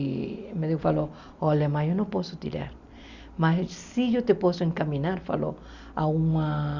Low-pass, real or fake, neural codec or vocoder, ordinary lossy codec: 7.2 kHz; real; none; Opus, 64 kbps